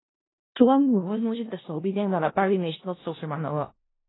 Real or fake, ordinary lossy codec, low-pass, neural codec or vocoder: fake; AAC, 16 kbps; 7.2 kHz; codec, 16 kHz in and 24 kHz out, 0.4 kbps, LongCat-Audio-Codec, four codebook decoder